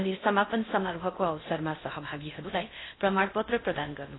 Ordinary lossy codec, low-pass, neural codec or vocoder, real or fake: AAC, 16 kbps; 7.2 kHz; codec, 16 kHz in and 24 kHz out, 0.6 kbps, FocalCodec, streaming, 4096 codes; fake